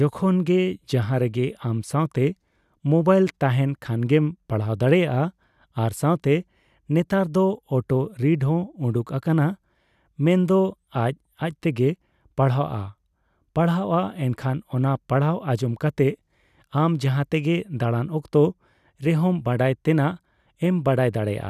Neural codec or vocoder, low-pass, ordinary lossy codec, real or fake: none; 14.4 kHz; none; real